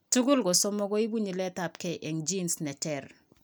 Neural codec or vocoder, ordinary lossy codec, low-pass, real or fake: none; none; none; real